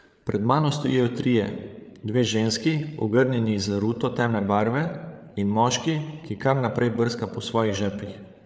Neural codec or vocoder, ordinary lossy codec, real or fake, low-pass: codec, 16 kHz, 8 kbps, FreqCodec, larger model; none; fake; none